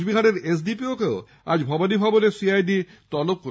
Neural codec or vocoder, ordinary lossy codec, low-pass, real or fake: none; none; none; real